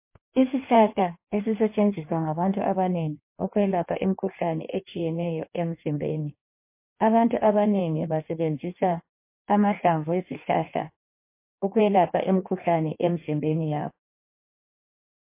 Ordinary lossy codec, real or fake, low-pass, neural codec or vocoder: MP3, 24 kbps; fake; 3.6 kHz; codec, 16 kHz in and 24 kHz out, 1.1 kbps, FireRedTTS-2 codec